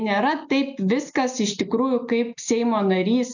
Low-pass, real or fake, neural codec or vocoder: 7.2 kHz; real; none